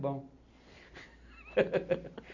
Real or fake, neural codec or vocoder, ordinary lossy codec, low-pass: real; none; none; 7.2 kHz